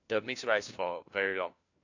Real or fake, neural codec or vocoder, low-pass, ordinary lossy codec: fake; codec, 16 kHz, 1 kbps, FunCodec, trained on LibriTTS, 50 frames a second; 7.2 kHz; AAC, 48 kbps